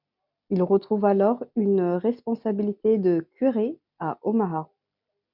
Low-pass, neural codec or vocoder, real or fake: 5.4 kHz; none; real